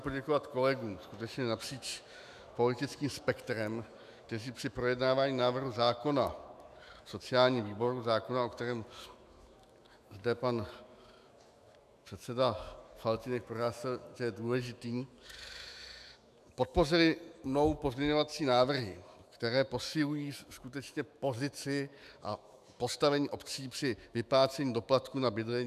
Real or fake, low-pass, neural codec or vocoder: fake; 14.4 kHz; autoencoder, 48 kHz, 128 numbers a frame, DAC-VAE, trained on Japanese speech